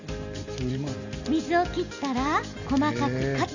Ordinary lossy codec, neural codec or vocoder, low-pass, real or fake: Opus, 64 kbps; none; 7.2 kHz; real